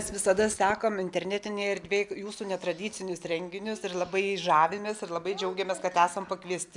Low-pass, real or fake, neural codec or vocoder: 10.8 kHz; real; none